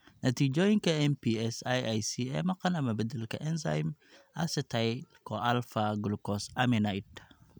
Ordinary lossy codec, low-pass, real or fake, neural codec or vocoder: none; none; real; none